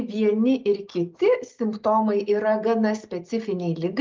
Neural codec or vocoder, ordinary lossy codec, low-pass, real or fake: none; Opus, 32 kbps; 7.2 kHz; real